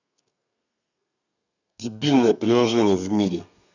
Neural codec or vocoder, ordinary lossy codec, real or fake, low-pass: codec, 32 kHz, 1.9 kbps, SNAC; none; fake; 7.2 kHz